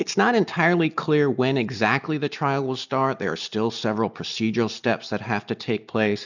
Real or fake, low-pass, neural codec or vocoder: real; 7.2 kHz; none